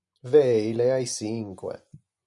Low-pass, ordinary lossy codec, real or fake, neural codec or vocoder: 10.8 kHz; MP3, 96 kbps; fake; vocoder, 24 kHz, 100 mel bands, Vocos